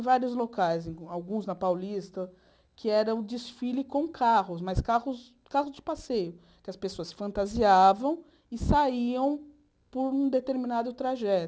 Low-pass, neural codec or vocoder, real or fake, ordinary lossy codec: none; none; real; none